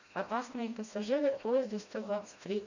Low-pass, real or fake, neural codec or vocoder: 7.2 kHz; fake; codec, 16 kHz, 1 kbps, FreqCodec, smaller model